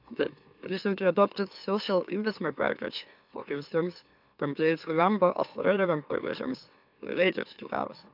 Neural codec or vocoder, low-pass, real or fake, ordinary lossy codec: autoencoder, 44.1 kHz, a latent of 192 numbers a frame, MeloTTS; 5.4 kHz; fake; none